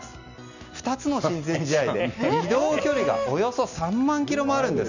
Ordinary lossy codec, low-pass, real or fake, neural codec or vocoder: none; 7.2 kHz; real; none